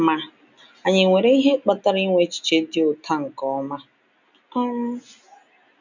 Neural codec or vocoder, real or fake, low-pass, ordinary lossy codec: none; real; 7.2 kHz; none